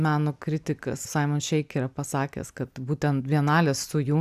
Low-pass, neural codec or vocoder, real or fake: 14.4 kHz; none; real